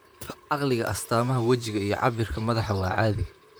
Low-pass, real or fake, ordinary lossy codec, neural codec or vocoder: none; fake; none; vocoder, 44.1 kHz, 128 mel bands, Pupu-Vocoder